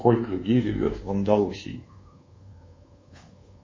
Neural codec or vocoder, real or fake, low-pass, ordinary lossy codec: codec, 24 kHz, 1.2 kbps, DualCodec; fake; 7.2 kHz; MP3, 32 kbps